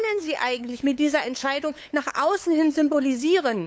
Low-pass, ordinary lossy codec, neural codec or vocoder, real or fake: none; none; codec, 16 kHz, 8 kbps, FunCodec, trained on LibriTTS, 25 frames a second; fake